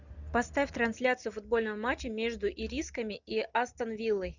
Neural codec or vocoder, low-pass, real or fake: none; 7.2 kHz; real